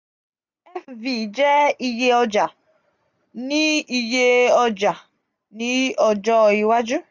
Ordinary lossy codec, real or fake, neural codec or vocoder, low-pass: none; real; none; 7.2 kHz